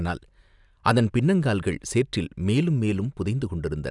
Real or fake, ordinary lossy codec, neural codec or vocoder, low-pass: real; none; none; 10.8 kHz